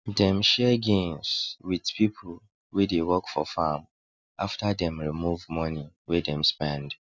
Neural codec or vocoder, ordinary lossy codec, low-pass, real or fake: none; none; none; real